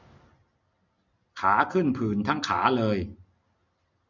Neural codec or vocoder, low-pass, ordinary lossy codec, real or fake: none; 7.2 kHz; none; real